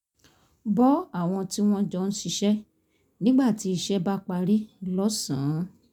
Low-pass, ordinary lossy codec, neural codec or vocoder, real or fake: 19.8 kHz; none; none; real